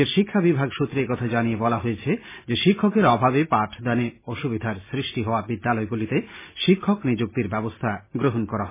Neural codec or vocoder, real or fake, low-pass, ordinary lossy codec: none; real; 3.6 kHz; MP3, 16 kbps